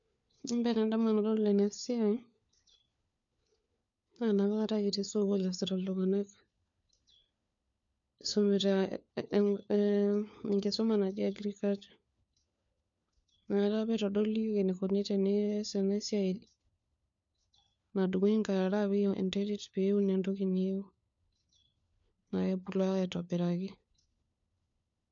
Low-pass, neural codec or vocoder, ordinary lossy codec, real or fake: 7.2 kHz; codec, 16 kHz, 4 kbps, FunCodec, trained on LibriTTS, 50 frames a second; MP3, 64 kbps; fake